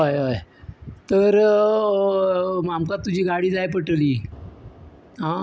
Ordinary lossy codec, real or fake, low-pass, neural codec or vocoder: none; real; none; none